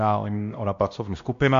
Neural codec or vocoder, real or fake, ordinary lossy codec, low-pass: codec, 16 kHz, 1 kbps, X-Codec, WavLM features, trained on Multilingual LibriSpeech; fake; MP3, 64 kbps; 7.2 kHz